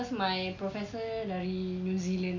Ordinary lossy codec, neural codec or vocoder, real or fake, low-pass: MP3, 48 kbps; none; real; 7.2 kHz